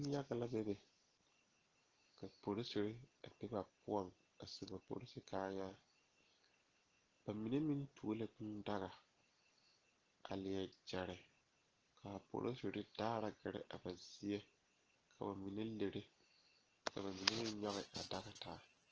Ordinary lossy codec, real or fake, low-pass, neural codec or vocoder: Opus, 16 kbps; real; 7.2 kHz; none